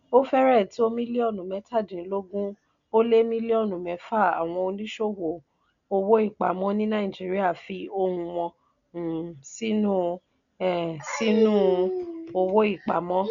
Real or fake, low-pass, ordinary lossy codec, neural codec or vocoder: real; 7.2 kHz; none; none